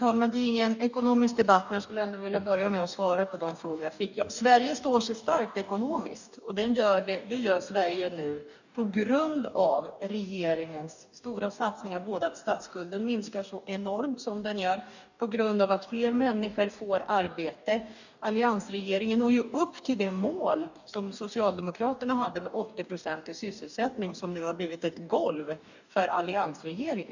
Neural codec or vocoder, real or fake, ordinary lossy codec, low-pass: codec, 44.1 kHz, 2.6 kbps, DAC; fake; none; 7.2 kHz